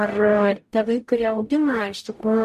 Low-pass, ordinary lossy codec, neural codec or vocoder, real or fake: 14.4 kHz; MP3, 64 kbps; codec, 44.1 kHz, 0.9 kbps, DAC; fake